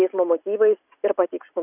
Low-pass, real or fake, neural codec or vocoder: 3.6 kHz; real; none